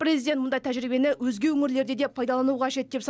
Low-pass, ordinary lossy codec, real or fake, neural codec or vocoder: none; none; real; none